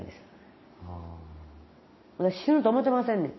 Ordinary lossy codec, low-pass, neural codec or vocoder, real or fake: MP3, 24 kbps; 7.2 kHz; none; real